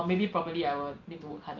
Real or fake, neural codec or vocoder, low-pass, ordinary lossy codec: real; none; 7.2 kHz; Opus, 32 kbps